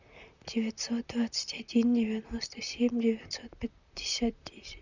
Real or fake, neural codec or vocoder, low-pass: fake; vocoder, 44.1 kHz, 128 mel bands, Pupu-Vocoder; 7.2 kHz